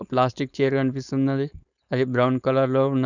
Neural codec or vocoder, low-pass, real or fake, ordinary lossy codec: codec, 16 kHz, 4.8 kbps, FACodec; 7.2 kHz; fake; none